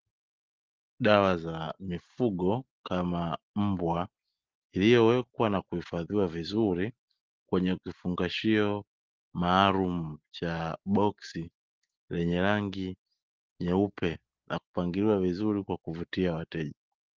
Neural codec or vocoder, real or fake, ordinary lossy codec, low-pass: none; real; Opus, 16 kbps; 7.2 kHz